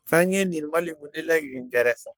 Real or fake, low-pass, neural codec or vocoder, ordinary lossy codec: fake; none; codec, 44.1 kHz, 3.4 kbps, Pupu-Codec; none